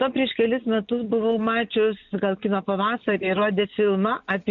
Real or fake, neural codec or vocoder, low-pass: real; none; 7.2 kHz